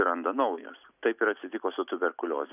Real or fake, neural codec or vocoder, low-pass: real; none; 3.6 kHz